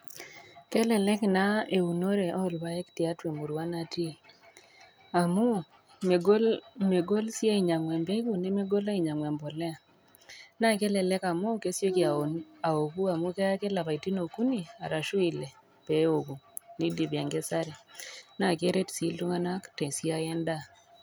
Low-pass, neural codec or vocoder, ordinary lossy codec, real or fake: none; none; none; real